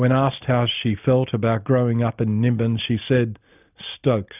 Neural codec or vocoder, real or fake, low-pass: none; real; 3.6 kHz